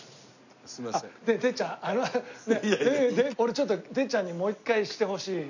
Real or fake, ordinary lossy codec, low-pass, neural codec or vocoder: real; none; 7.2 kHz; none